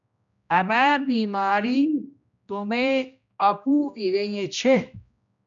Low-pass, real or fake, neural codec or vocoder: 7.2 kHz; fake; codec, 16 kHz, 1 kbps, X-Codec, HuBERT features, trained on general audio